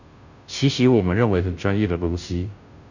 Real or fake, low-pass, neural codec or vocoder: fake; 7.2 kHz; codec, 16 kHz, 0.5 kbps, FunCodec, trained on Chinese and English, 25 frames a second